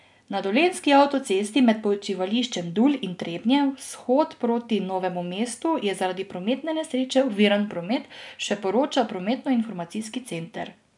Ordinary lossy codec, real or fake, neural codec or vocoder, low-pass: none; real; none; 10.8 kHz